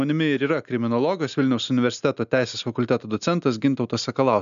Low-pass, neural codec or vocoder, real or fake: 7.2 kHz; none; real